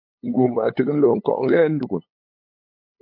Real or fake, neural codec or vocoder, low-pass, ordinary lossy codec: fake; codec, 16 kHz, 8 kbps, FunCodec, trained on LibriTTS, 25 frames a second; 5.4 kHz; MP3, 32 kbps